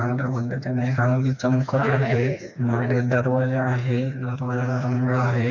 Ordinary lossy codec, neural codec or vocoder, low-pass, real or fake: none; codec, 16 kHz, 2 kbps, FreqCodec, smaller model; 7.2 kHz; fake